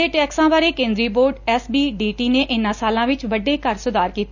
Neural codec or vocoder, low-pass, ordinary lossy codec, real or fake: none; 7.2 kHz; none; real